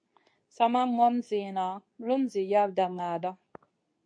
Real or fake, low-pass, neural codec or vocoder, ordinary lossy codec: fake; 9.9 kHz; codec, 24 kHz, 0.9 kbps, WavTokenizer, medium speech release version 2; MP3, 48 kbps